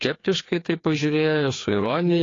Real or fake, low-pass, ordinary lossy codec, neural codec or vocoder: fake; 7.2 kHz; AAC, 32 kbps; codec, 16 kHz, 2 kbps, FreqCodec, larger model